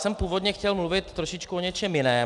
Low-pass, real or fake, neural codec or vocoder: 10.8 kHz; real; none